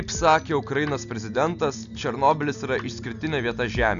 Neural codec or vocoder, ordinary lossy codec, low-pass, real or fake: none; MP3, 96 kbps; 7.2 kHz; real